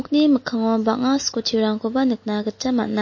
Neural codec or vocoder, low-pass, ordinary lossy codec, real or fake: none; 7.2 kHz; MP3, 32 kbps; real